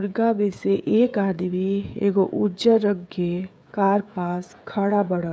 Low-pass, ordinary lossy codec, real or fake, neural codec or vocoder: none; none; fake; codec, 16 kHz, 16 kbps, FreqCodec, smaller model